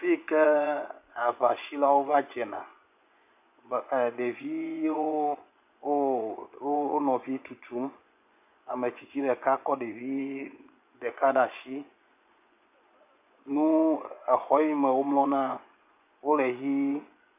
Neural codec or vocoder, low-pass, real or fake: vocoder, 24 kHz, 100 mel bands, Vocos; 3.6 kHz; fake